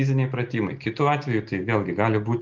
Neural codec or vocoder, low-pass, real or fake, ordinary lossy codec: none; 7.2 kHz; real; Opus, 32 kbps